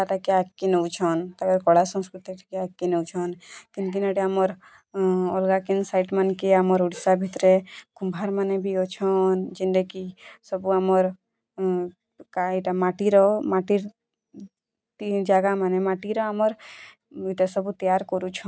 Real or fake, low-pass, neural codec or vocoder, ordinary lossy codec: real; none; none; none